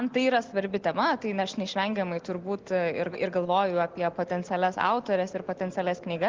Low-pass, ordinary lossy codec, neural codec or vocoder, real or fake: 7.2 kHz; Opus, 16 kbps; none; real